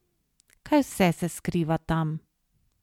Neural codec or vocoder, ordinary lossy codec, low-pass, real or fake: none; MP3, 96 kbps; 19.8 kHz; real